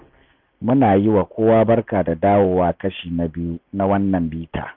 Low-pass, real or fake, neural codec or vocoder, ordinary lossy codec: 5.4 kHz; real; none; none